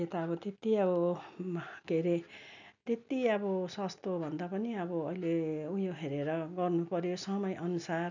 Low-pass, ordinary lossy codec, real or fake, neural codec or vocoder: 7.2 kHz; none; real; none